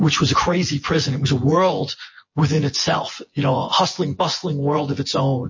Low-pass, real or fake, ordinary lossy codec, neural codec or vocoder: 7.2 kHz; fake; MP3, 32 kbps; vocoder, 24 kHz, 100 mel bands, Vocos